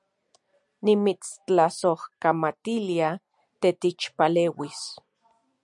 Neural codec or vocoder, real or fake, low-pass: none; real; 10.8 kHz